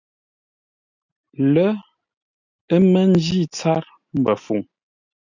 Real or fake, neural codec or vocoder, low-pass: real; none; 7.2 kHz